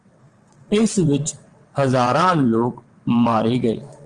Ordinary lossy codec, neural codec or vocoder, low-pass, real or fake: Opus, 24 kbps; vocoder, 22.05 kHz, 80 mel bands, WaveNeXt; 9.9 kHz; fake